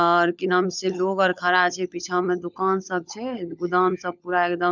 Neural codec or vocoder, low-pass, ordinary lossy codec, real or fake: codec, 16 kHz, 16 kbps, FunCodec, trained on LibriTTS, 50 frames a second; 7.2 kHz; none; fake